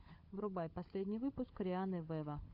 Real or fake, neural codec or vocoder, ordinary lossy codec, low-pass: fake; codec, 16 kHz, 16 kbps, FunCodec, trained on LibriTTS, 50 frames a second; AAC, 48 kbps; 5.4 kHz